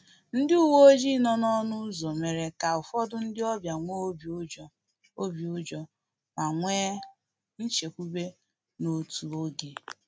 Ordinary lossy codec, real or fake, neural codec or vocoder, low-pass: none; real; none; none